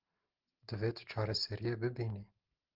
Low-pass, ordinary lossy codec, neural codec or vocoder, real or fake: 5.4 kHz; Opus, 16 kbps; none; real